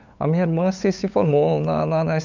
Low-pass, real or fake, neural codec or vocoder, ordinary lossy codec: 7.2 kHz; fake; codec, 16 kHz, 8 kbps, FunCodec, trained on LibriTTS, 25 frames a second; none